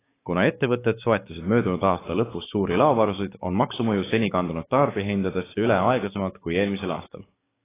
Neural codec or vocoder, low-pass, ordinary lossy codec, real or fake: codec, 16 kHz, 6 kbps, DAC; 3.6 kHz; AAC, 16 kbps; fake